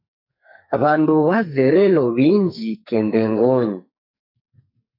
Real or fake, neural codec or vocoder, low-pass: fake; codec, 44.1 kHz, 2.6 kbps, SNAC; 5.4 kHz